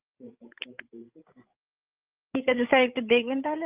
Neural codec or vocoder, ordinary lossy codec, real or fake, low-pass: codec, 16 kHz, 16 kbps, FreqCodec, larger model; Opus, 24 kbps; fake; 3.6 kHz